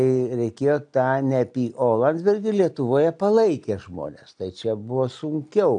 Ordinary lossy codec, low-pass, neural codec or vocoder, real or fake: AAC, 64 kbps; 9.9 kHz; none; real